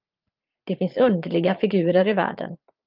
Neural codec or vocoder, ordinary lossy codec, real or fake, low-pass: vocoder, 44.1 kHz, 80 mel bands, Vocos; Opus, 32 kbps; fake; 5.4 kHz